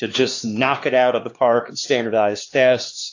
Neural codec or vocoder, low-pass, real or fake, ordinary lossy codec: codec, 16 kHz, 2 kbps, X-Codec, HuBERT features, trained on LibriSpeech; 7.2 kHz; fake; AAC, 48 kbps